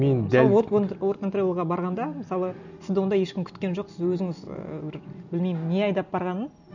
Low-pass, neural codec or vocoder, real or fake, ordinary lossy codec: 7.2 kHz; none; real; none